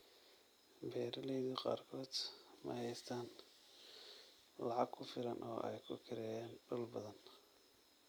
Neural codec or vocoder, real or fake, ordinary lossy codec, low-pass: none; real; none; none